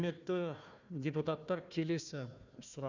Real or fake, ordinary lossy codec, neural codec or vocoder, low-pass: fake; none; codec, 16 kHz, 1 kbps, FunCodec, trained on Chinese and English, 50 frames a second; 7.2 kHz